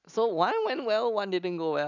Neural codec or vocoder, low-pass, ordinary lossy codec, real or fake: none; 7.2 kHz; none; real